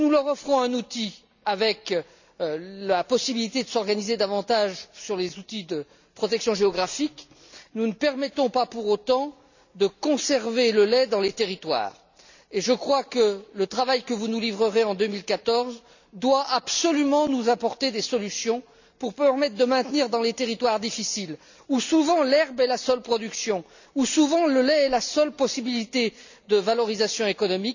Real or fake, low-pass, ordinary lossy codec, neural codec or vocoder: real; 7.2 kHz; none; none